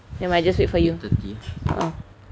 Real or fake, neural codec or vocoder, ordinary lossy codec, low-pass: real; none; none; none